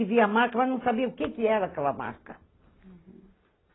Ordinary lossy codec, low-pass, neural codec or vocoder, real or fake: AAC, 16 kbps; 7.2 kHz; none; real